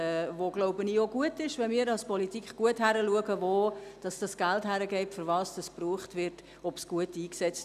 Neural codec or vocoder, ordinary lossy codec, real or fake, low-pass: none; none; real; 14.4 kHz